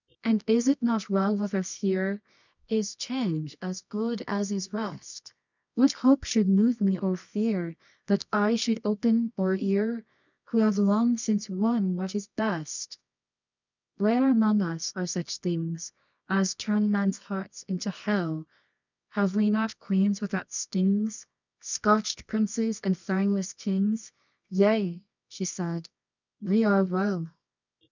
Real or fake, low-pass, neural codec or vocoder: fake; 7.2 kHz; codec, 24 kHz, 0.9 kbps, WavTokenizer, medium music audio release